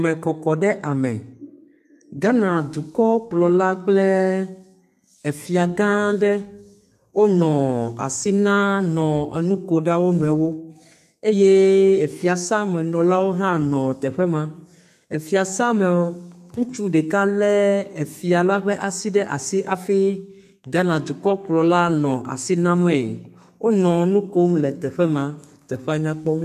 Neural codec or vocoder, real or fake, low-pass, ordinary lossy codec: codec, 32 kHz, 1.9 kbps, SNAC; fake; 14.4 kHz; AAC, 96 kbps